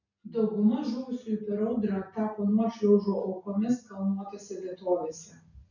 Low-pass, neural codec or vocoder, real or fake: 7.2 kHz; none; real